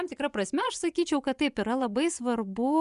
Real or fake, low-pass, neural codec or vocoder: fake; 10.8 kHz; vocoder, 24 kHz, 100 mel bands, Vocos